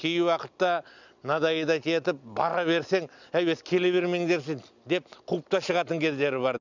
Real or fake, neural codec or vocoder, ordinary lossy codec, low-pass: real; none; none; 7.2 kHz